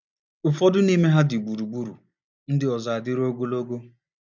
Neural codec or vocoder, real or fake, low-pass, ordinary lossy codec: none; real; 7.2 kHz; none